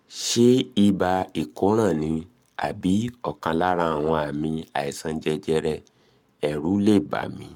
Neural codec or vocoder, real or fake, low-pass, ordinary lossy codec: codec, 44.1 kHz, 7.8 kbps, Pupu-Codec; fake; 19.8 kHz; MP3, 96 kbps